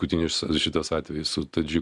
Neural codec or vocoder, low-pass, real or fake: none; 10.8 kHz; real